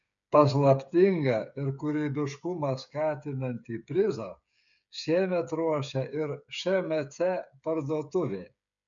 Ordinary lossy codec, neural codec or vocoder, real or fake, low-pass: MP3, 96 kbps; codec, 16 kHz, 8 kbps, FreqCodec, smaller model; fake; 7.2 kHz